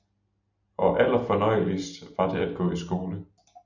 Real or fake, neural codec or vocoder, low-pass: real; none; 7.2 kHz